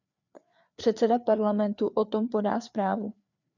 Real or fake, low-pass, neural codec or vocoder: fake; 7.2 kHz; codec, 16 kHz, 4 kbps, FreqCodec, larger model